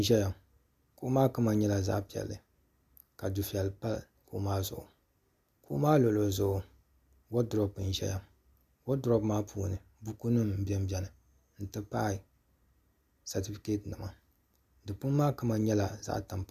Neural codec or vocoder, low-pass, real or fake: none; 14.4 kHz; real